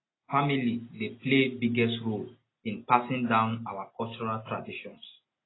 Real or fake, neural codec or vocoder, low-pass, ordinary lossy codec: real; none; 7.2 kHz; AAC, 16 kbps